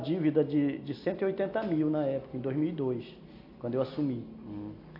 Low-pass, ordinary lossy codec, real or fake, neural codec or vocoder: 5.4 kHz; AAC, 32 kbps; real; none